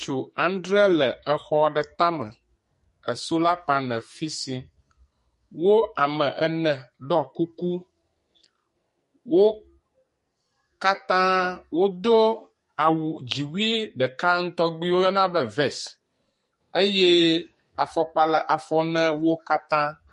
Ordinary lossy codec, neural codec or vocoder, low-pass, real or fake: MP3, 48 kbps; codec, 44.1 kHz, 2.6 kbps, SNAC; 14.4 kHz; fake